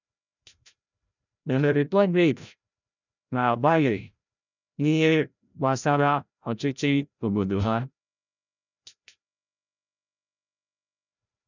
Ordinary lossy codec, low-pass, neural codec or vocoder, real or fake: none; 7.2 kHz; codec, 16 kHz, 0.5 kbps, FreqCodec, larger model; fake